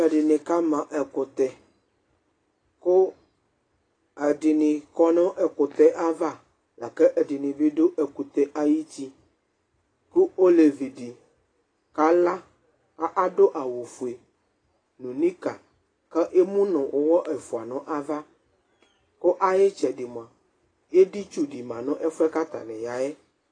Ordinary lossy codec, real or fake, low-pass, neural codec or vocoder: AAC, 32 kbps; real; 9.9 kHz; none